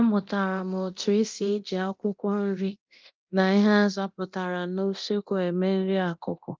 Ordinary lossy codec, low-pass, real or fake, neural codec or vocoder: Opus, 24 kbps; 7.2 kHz; fake; codec, 24 kHz, 0.9 kbps, DualCodec